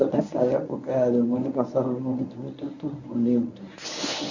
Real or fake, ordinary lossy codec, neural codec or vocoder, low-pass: fake; none; codec, 24 kHz, 0.9 kbps, WavTokenizer, medium speech release version 1; 7.2 kHz